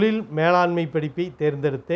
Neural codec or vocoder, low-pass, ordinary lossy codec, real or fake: none; none; none; real